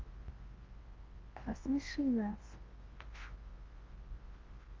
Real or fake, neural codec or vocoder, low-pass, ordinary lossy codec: fake; codec, 24 kHz, 0.9 kbps, WavTokenizer, large speech release; 7.2 kHz; Opus, 16 kbps